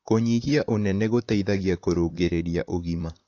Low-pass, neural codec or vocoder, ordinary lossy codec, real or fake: 7.2 kHz; vocoder, 44.1 kHz, 128 mel bands, Pupu-Vocoder; AAC, 48 kbps; fake